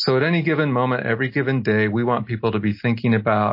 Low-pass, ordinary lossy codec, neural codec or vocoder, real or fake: 5.4 kHz; MP3, 32 kbps; none; real